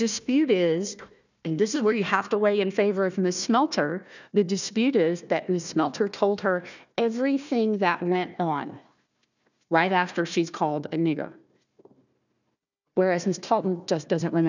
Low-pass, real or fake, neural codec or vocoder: 7.2 kHz; fake; codec, 16 kHz, 1 kbps, FunCodec, trained on Chinese and English, 50 frames a second